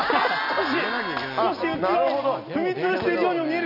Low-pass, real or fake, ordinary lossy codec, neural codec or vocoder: 5.4 kHz; real; none; none